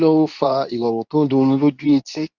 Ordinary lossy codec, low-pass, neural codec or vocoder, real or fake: none; 7.2 kHz; codec, 24 kHz, 0.9 kbps, WavTokenizer, medium speech release version 2; fake